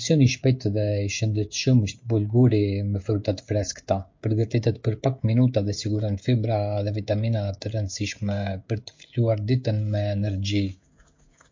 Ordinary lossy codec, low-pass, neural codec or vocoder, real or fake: MP3, 48 kbps; 7.2 kHz; codec, 16 kHz, 16 kbps, FreqCodec, smaller model; fake